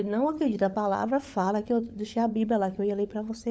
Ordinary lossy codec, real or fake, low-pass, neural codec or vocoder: none; fake; none; codec, 16 kHz, 16 kbps, FunCodec, trained on LibriTTS, 50 frames a second